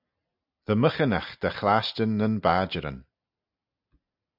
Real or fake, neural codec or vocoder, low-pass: real; none; 5.4 kHz